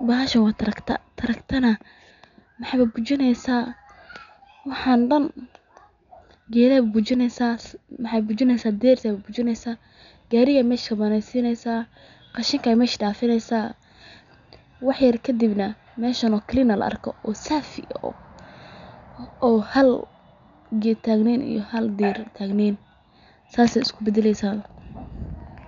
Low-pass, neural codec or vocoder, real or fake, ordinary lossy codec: 7.2 kHz; none; real; none